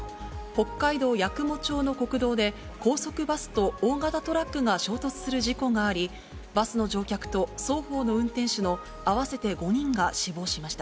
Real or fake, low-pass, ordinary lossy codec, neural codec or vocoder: real; none; none; none